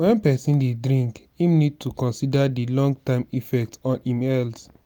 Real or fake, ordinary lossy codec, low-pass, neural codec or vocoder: real; none; none; none